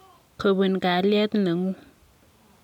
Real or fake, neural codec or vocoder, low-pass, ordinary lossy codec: real; none; 19.8 kHz; none